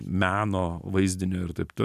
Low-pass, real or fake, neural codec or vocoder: 14.4 kHz; real; none